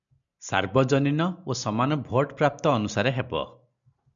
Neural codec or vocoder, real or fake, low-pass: none; real; 7.2 kHz